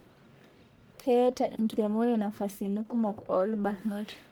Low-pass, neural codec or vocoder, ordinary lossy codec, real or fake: none; codec, 44.1 kHz, 1.7 kbps, Pupu-Codec; none; fake